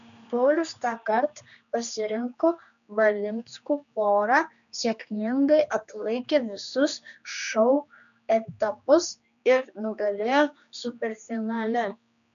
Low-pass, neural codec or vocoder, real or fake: 7.2 kHz; codec, 16 kHz, 2 kbps, X-Codec, HuBERT features, trained on general audio; fake